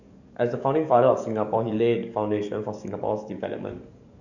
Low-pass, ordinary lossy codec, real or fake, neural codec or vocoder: 7.2 kHz; none; fake; codec, 44.1 kHz, 7.8 kbps, DAC